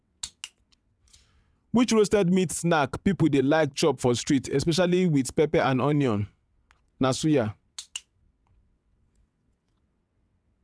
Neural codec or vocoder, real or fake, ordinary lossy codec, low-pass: vocoder, 22.05 kHz, 80 mel bands, WaveNeXt; fake; none; none